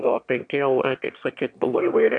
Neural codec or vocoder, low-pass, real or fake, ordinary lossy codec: autoencoder, 22.05 kHz, a latent of 192 numbers a frame, VITS, trained on one speaker; 9.9 kHz; fake; AAC, 64 kbps